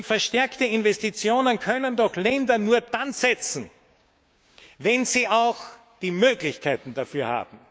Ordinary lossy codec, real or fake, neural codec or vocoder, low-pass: none; fake; codec, 16 kHz, 6 kbps, DAC; none